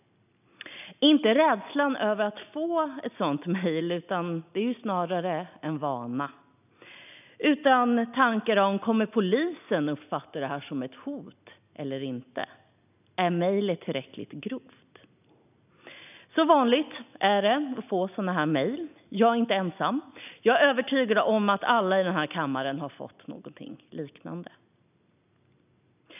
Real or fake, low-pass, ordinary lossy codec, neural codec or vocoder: real; 3.6 kHz; none; none